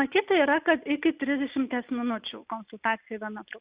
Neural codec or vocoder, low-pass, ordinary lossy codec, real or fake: none; 3.6 kHz; Opus, 64 kbps; real